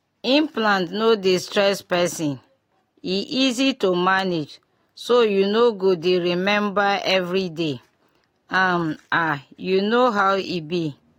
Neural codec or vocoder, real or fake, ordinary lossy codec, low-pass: none; real; AAC, 48 kbps; 19.8 kHz